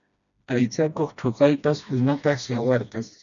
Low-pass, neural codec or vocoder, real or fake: 7.2 kHz; codec, 16 kHz, 1 kbps, FreqCodec, smaller model; fake